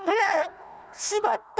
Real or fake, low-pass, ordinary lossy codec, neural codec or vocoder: fake; none; none; codec, 16 kHz, 1 kbps, FunCodec, trained on Chinese and English, 50 frames a second